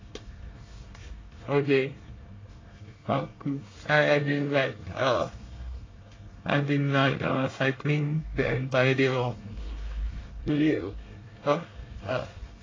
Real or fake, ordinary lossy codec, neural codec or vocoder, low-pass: fake; AAC, 32 kbps; codec, 24 kHz, 1 kbps, SNAC; 7.2 kHz